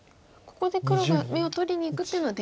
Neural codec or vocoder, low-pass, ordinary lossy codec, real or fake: none; none; none; real